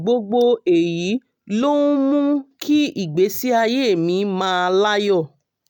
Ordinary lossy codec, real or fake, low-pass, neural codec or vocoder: none; real; 19.8 kHz; none